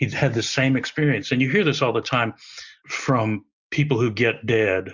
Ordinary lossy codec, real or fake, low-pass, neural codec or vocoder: Opus, 64 kbps; real; 7.2 kHz; none